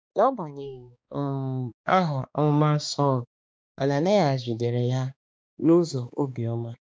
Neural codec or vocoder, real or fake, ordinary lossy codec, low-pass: codec, 16 kHz, 2 kbps, X-Codec, HuBERT features, trained on balanced general audio; fake; none; none